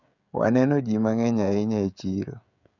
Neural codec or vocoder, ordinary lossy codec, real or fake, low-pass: codec, 16 kHz, 16 kbps, FreqCodec, smaller model; none; fake; 7.2 kHz